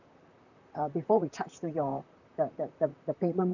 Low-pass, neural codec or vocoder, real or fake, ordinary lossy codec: 7.2 kHz; vocoder, 44.1 kHz, 128 mel bands, Pupu-Vocoder; fake; none